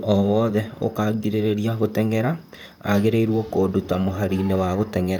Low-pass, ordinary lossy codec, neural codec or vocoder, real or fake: 19.8 kHz; none; vocoder, 44.1 kHz, 128 mel bands, Pupu-Vocoder; fake